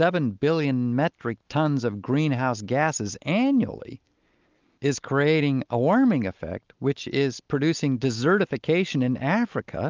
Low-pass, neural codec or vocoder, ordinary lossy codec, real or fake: 7.2 kHz; none; Opus, 24 kbps; real